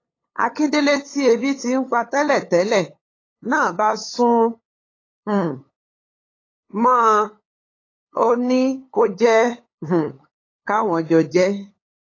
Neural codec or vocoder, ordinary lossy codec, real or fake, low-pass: codec, 16 kHz, 8 kbps, FunCodec, trained on LibriTTS, 25 frames a second; AAC, 32 kbps; fake; 7.2 kHz